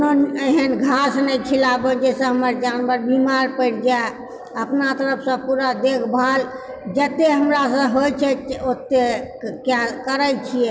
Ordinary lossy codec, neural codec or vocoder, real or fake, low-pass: none; none; real; none